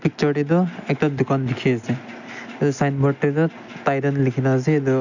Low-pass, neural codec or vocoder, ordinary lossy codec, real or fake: 7.2 kHz; none; none; real